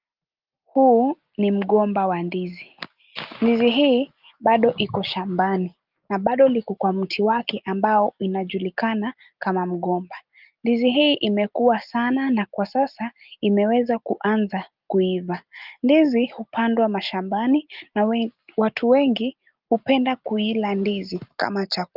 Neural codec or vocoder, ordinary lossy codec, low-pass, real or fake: none; Opus, 32 kbps; 5.4 kHz; real